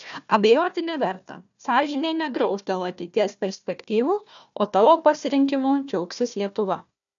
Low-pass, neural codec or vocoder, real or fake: 7.2 kHz; codec, 16 kHz, 1 kbps, FunCodec, trained on Chinese and English, 50 frames a second; fake